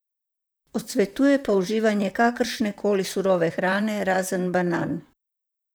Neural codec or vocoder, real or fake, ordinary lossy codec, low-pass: vocoder, 44.1 kHz, 128 mel bands, Pupu-Vocoder; fake; none; none